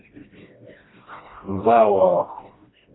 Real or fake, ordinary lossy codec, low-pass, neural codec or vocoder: fake; AAC, 16 kbps; 7.2 kHz; codec, 16 kHz, 1 kbps, FreqCodec, smaller model